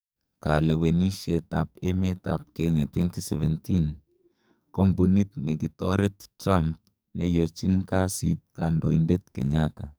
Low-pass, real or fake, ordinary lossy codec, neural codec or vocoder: none; fake; none; codec, 44.1 kHz, 2.6 kbps, SNAC